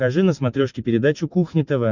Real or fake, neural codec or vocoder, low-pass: real; none; 7.2 kHz